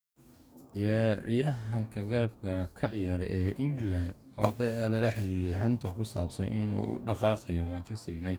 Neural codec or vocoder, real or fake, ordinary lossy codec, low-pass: codec, 44.1 kHz, 2.6 kbps, DAC; fake; none; none